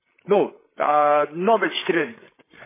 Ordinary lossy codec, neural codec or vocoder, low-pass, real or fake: MP3, 16 kbps; codec, 16 kHz, 8 kbps, FreqCodec, larger model; 3.6 kHz; fake